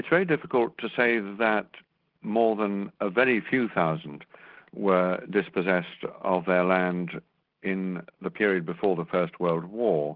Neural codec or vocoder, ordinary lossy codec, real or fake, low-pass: none; Opus, 16 kbps; real; 5.4 kHz